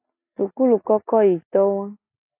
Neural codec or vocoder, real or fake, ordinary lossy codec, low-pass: none; real; AAC, 32 kbps; 3.6 kHz